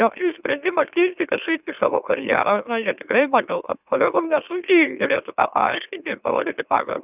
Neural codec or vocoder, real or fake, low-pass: autoencoder, 44.1 kHz, a latent of 192 numbers a frame, MeloTTS; fake; 3.6 kHz